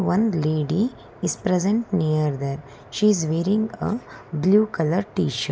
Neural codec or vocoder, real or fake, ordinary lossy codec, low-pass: none; real; none; none